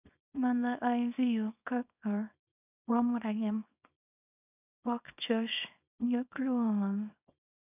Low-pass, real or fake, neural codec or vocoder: 3.6 kHz; fake; codec, 24 kHz, 0.9 kbps, WavTokenizer, small release